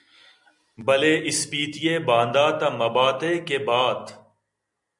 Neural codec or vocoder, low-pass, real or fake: none; 10.8 kHz; real